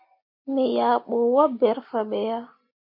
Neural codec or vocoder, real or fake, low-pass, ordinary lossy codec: none; real; 5.4 kHz; MP3, 32 kbps